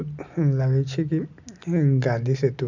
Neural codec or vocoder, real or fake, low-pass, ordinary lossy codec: none; real; 7.2 kHz; none